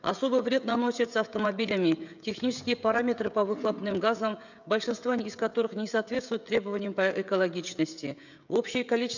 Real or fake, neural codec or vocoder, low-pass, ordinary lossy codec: fake; codec, 16 kHz, 16 kbps, FreqCodec, smaller model; 7.2 kHz; none